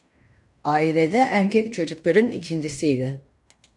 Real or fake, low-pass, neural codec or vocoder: fake; 10.8 kHz; codec, 16 kHz in and 24 kHz out, 0.9 kbps, LongCat-Audio-Codec, fine tuned four codebook decoder